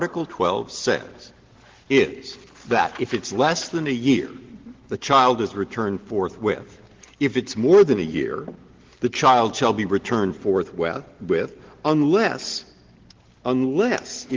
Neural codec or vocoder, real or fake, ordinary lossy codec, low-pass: none; real; Opus, 16 kbps; 7.2 kHz